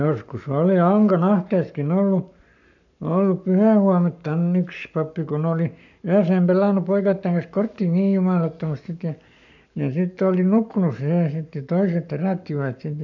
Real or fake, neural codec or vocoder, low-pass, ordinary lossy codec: fake; codec, 44.1 kHz, 7.8 kbps, Pupu-Codec; 7.2 kHz; none